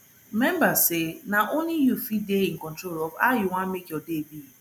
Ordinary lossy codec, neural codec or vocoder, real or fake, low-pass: none; none; real; none